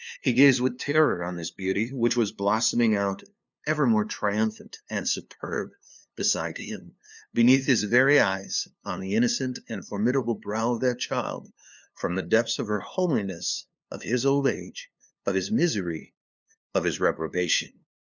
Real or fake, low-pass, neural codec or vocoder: fake; 7.2 kHz; codec, 16 kHz, 2 kbps, FunCodec, trained on LibriTTS, 25 frames a second